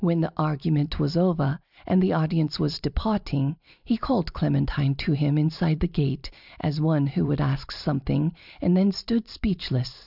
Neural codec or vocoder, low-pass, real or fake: vocoder, 44.1 kHz, 128 mel bands every 256 samples, BigVGAN v2; 5.4 kHz; fake